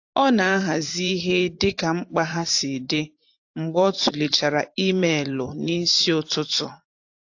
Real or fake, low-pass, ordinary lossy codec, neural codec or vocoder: fake; 7.2 kHz; AAC, 48 kbps; vocoder, 44.1 kHz, 128 mel bands every 512 samples, BigVGAN v2